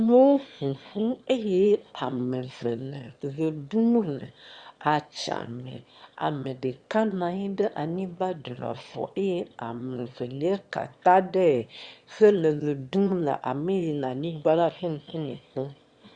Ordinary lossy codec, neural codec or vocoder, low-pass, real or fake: Opus, 64 kbps; autoencoder, 22.05 kHz, a latent of 192 numbers a frame, VITS, trained on one speaker; 9.9 kHz; fake